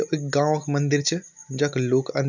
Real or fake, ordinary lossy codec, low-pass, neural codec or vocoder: real; none; 7.2 kHz; none